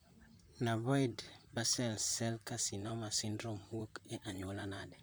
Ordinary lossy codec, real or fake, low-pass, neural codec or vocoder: none; fake; none; vocoder, 44.1 kHz, 128 mel bands, Pupu-Vocoder